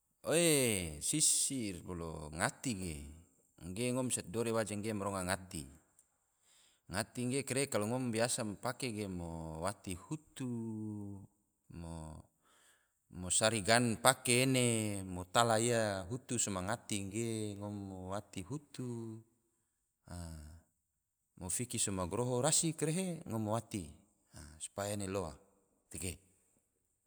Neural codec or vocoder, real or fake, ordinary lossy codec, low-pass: none; real; none; none